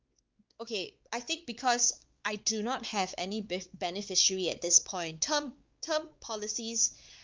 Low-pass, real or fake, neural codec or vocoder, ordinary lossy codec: 7.2 kHz; fake; codec, 16 kHz, 4 kbps, X-Codec, WavLM features, trained on Multilingual LibriSpeech; Opus, 32 kbps